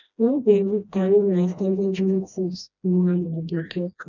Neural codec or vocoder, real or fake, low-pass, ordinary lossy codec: codec, 16 kHz, 1 kbps, FreqCodec, smaller model; fake; 7.2 kHz; none